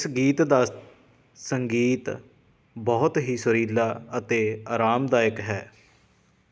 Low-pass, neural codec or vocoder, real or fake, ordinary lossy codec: none; none; real; none